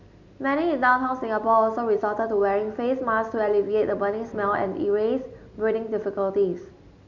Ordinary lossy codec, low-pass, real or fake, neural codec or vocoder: none; 7.2 kHz; real; none